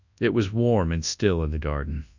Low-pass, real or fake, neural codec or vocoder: 7.2 kHz; fake; codec, 24 kHz, 0.9 kbps, WavTokenizer, large speech release